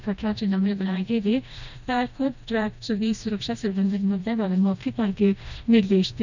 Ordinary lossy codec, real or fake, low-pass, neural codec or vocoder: none; fake; 7.2 kHz; codec, 16 kHz, 1 kbps, FreqCodec, smaller model